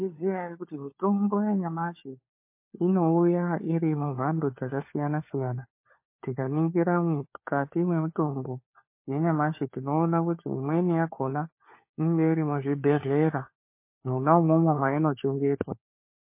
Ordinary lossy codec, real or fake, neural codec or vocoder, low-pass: MP3, 24 kbps; fake; codec, 16 kHz, 4 kbps, FunCodec, trained on LibriTTS, 50 frames a second; 3.6 kHz